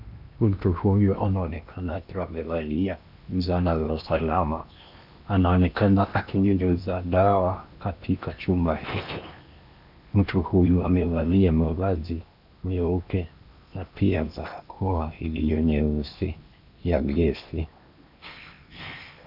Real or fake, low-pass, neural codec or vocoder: fake; 5.4 kHz; codec, 16 kHz in and 24 kHz out, 0.8 kbps, FocalCodec, streaming, 65536 codes